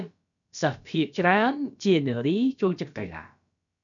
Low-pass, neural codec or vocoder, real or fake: 7.2 kHz; codec, 16 kHz, about 1 kbps, DyCAST, with the encoder's durations; fake